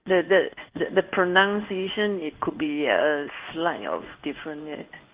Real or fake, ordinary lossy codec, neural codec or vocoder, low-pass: fake; Opus, 32 kbps; codec, 16 kHz in and 24 kHz out, 1 kbps, XY-Tokenizer; 3.6 kHz